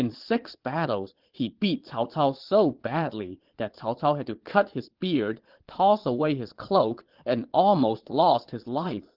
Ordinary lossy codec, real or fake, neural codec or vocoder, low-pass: Opus, 16 kbps; fake; vocoder, 22.05 kHz, 80 mel bands, Vocos; 5.4 kHz